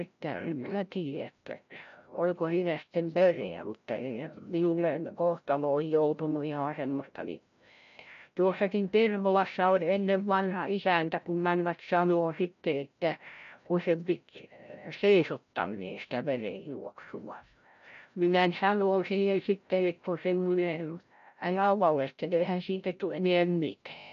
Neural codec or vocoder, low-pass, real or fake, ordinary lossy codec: codec, 16 kHz, 0.5 kbps, FreqCodec, larger model; 7.2 kHz; fake; none